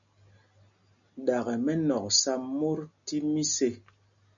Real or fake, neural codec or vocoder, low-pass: real; none; 7.2 kHz